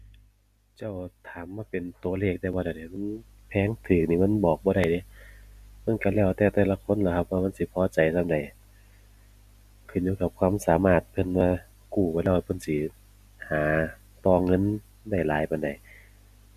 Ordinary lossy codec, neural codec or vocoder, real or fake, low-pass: none; none; real; 14.4 kHz